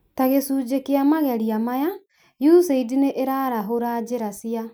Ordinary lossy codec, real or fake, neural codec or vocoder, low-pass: none; real; none; none